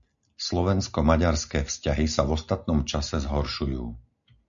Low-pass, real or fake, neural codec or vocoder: 7.2 kHz; real; none